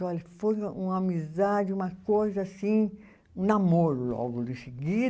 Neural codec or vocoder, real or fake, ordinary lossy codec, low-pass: none; real; none; none